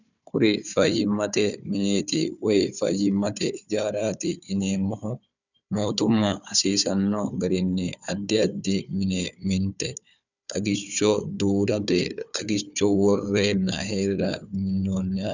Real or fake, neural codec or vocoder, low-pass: fake; codec, 16 kHz, 4 kbps, FunCodec, trained on Chinese and English, 50 frames a second; 7.2 kHz